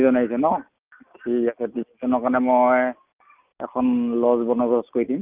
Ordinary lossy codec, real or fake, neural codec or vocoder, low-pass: Opus, 24 kbps; real; none; 3.6 kHz